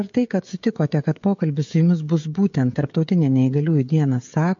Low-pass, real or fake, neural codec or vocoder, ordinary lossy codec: 7.2 kHz; fake; codec, 16 kHz, 16 kbps, FreqCodec, smaller model; MP3, 48 kbps